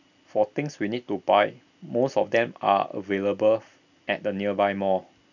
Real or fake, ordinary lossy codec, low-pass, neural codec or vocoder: real; none; 7.2 kHz; none